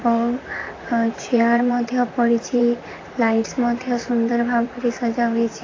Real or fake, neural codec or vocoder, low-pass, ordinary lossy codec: fake; vocoder, 44.1 kHz, 128 mel bands, Pupu-Vocoder; 7.2 kHz; AAC, 32 kbps